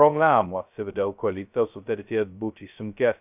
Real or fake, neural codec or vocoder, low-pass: fake; codec, 16 kHz, 0.2 kbps, FocalCodec; 3.6 kHz